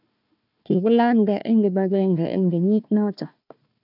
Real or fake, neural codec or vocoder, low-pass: fake; codec, 16 kHz, 1 kbps, FunCodec, trained on Chinese and English, 50 frames a second; 5.4 kHz